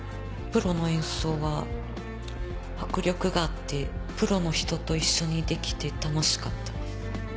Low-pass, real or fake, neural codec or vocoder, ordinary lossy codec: none; real; none; none